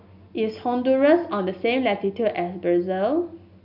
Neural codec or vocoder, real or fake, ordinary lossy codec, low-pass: none; real; none; 5.4 kHz